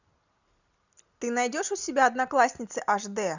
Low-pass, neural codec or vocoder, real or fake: 7.2 kHz; none; real